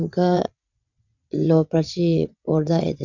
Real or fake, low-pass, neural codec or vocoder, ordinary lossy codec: fake; 7.2 kHz; vocoder, 22.05 kHz, 80 mel bands, WaveNeXt; none